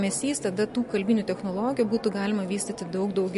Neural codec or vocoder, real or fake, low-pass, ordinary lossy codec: none; real; 14.4 kHz; MP3, 48 kbps